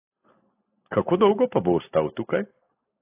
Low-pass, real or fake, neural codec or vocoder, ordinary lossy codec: 3.6 kHz; real; none; AAC, 16 kbps